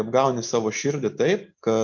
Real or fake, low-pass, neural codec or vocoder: real; 7.2 kHz; none